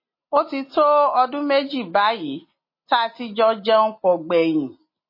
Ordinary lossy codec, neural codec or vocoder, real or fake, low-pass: MP3, 24 kbps; none; real; 5.4 kHz